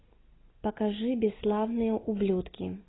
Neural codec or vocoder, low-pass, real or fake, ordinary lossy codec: none; 7.2 kHz; real; AAC, 16 kbps